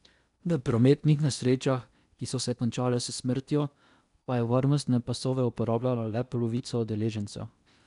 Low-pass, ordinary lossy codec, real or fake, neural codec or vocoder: 10.8 kHz; none; fake; codec, 16 kHz in and 24 kHz out, 0.8 kbps, FocalCodec, streaming, 65536 codes